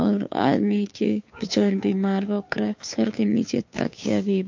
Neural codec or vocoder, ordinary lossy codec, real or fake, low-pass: codec, 16 kHz, 2 kbps, FunCodec, trained on Chinese and English, 25 frames a second; MP3, 48 kbps; fake; 7.2 kHz